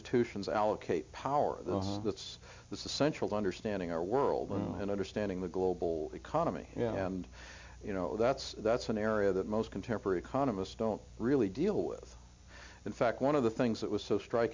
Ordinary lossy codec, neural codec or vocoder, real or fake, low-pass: MP3, 64 kbps; none; real; 7.2 kHz